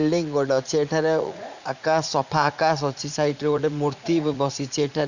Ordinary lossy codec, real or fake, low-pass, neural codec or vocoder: none; fake; 7.2 kHz; vocoder, 44.1 kHz, 80 mel bands, Vocos